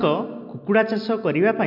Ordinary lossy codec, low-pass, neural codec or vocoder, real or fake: MP3, 48 kbps; 5.4 kHz; none; real